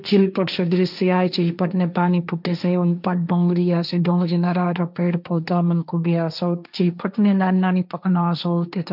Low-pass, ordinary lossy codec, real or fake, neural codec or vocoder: 5.4 kHz; none; fake; codec, 16 kHz, 1.1 kbps, Voila-Tokenizer